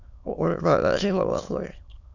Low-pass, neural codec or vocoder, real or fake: 7.2 kHz; autoencoder, 22.05 kHz, a latent of 192 numbers a frame, VITS, trained on many speakers; fake